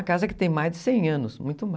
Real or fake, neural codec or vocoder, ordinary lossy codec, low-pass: real; none; none; none